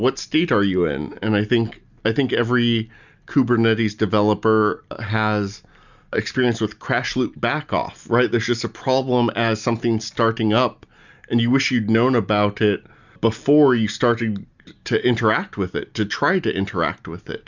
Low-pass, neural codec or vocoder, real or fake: 7.2 kHz; none; real